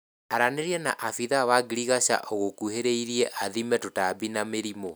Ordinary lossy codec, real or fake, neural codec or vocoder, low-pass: none; real; none; none